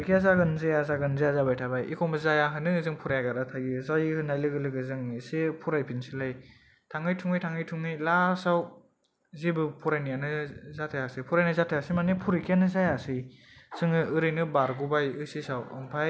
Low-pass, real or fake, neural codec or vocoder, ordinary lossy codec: none; real; none; none